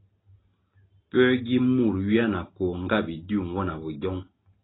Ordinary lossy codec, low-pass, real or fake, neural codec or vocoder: AAC, 16 kbps; 7.2 kHz; real; none